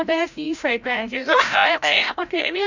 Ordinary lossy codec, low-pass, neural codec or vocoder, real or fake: none; 7.2 kHz; codec, 16 kHz, 0.5 kbps, FreqCodec, larger model; fake